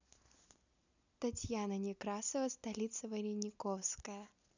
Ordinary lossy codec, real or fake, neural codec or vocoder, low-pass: none; real; none; 7.2 kHz